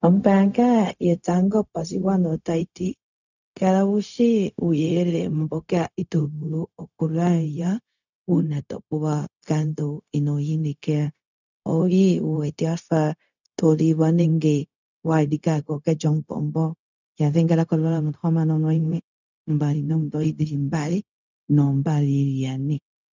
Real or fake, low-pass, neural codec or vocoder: fake; 7.2 kHz; codec, 16 kHz, 0.4 kbps, LongCat-Audio-Codec